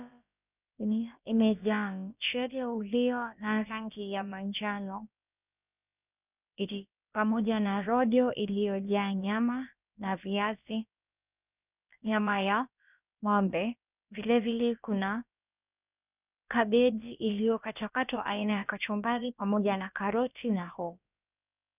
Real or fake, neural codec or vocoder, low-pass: fake; codec, 16 kHz, about 1 kbps, DyCAST, with the encoder's durations; 3.6 kHz